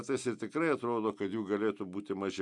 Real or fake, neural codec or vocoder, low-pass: real; none; 10.8 kHz